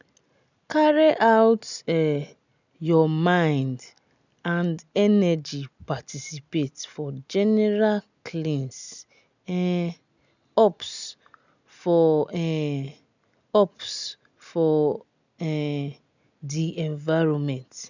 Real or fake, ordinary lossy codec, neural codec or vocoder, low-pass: real; none; none; 7.2 kHz